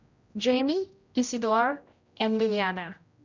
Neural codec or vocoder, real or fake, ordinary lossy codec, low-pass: codec, 16 kHz, 0.5 kbps, X-Codec, HuBERT features, trained on general audio; fake; Opus, 64 kbps; 7.2 kHz